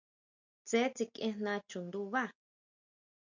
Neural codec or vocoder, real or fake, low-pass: none; real; 7.2 kHz